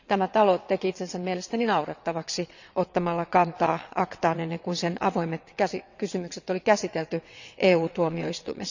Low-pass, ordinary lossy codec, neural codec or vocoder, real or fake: 7.2 kHz; none; vocoder, 22.05 kHz, 80 mel bands, WaveNeXt; fake